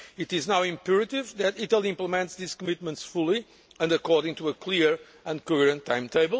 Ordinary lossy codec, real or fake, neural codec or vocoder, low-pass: none; real; none; none